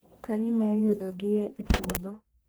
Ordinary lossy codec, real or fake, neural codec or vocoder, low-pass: none; fake; codec, 44.1 kHz, 1.7 kbps, Pupu-Codec; none